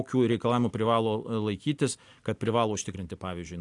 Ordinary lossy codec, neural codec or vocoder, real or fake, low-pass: AAC, 64 kbps; none; real; 10.8 kHz